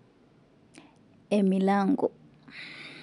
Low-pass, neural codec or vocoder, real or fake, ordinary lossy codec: 10.8 kHz; none; real; none